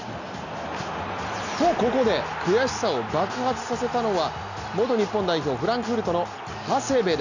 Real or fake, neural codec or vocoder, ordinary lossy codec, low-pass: real; none; none; 7.2 kHz